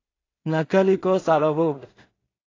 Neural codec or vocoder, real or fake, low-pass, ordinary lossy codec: codec, 16 kHz in and 24 kHz out, 0.4 kbps, LongCat-Audio-Codec, two codebook decoder; fake; 7.2 kHz; AAC, 32 kbps